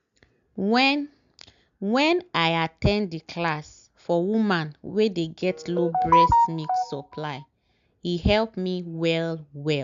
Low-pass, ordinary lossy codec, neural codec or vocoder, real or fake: 7.2 kHz; none; none; real